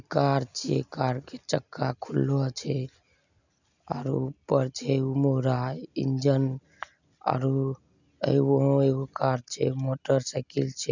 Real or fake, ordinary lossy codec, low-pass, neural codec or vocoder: real; none; 7.2 kHz; none